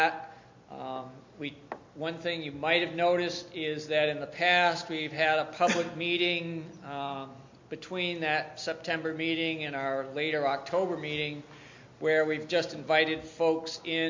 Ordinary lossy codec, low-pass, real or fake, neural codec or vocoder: MP3, 48 kbps; 7.2 kHz; real; none